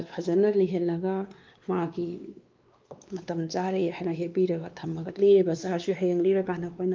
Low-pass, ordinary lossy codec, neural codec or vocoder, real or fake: 7.2 kHz; Opus, 24 kbps; codec, 16 kHz, 2 kbps, X-Codec, WavLM features, trained on Multilingual LibriSpeech; fake